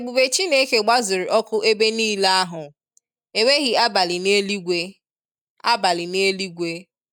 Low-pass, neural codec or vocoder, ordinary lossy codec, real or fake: 19.8 kHz; none; none; real